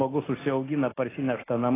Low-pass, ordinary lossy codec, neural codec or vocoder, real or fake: 3.6 kHz; AAC, 16 kbps; none; real